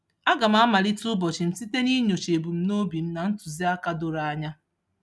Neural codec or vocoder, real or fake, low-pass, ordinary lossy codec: none; real; none; none